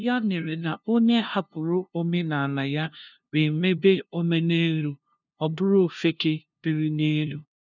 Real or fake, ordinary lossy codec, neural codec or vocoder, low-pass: fake; none; codec, 16 kHz, 0.5 kbps, FunCodec, trained on LibriTTS, 25 frames a second; 7.2 kHz